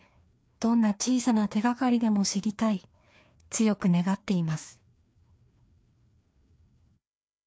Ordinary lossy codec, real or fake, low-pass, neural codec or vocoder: none; fake; none; codec, 16 kHz, 2 kbps, FreqCodec, larger model